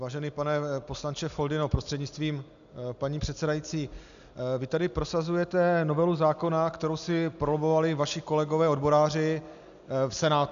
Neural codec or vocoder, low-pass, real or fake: none; 7.2 kHz; real